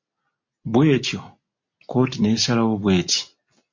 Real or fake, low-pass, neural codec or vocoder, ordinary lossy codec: real; 7.2 kHz; none; MP3, 48 kbps